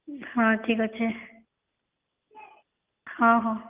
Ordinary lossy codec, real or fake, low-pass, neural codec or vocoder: Opus, 32 kbps; real; 3.6 kHz; none